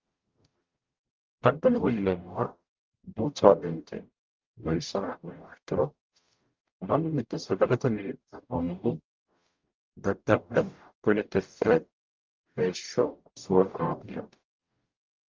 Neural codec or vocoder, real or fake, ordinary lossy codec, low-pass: codec, 44.1 kHz, 0.9 kbps, DAC; fake; Opus, 24 kbps; 7.2 kHz